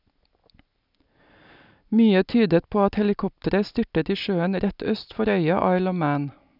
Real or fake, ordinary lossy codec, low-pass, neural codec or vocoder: real; none; 5.4 kHz; none